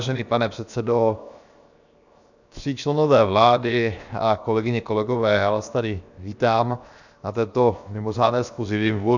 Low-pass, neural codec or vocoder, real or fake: 7.2 kHz; codec, 16 kHz, 0.7 kbps, FocalCodec; fake